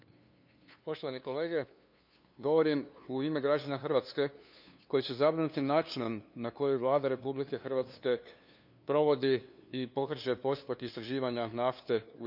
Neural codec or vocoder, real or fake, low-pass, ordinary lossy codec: codec, 16 kHz, 2 kbps, FunCodec, trained on LibriTTS, 25 frames a second; fake; 5.4 kHz; none